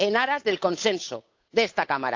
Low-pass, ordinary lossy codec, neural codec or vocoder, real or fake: 7.2 kHz; AAC, 48 kbps; codec, 16 kHz, 8 kbps, FunCodec, trained on Chinese and English, 25 frames a second; fake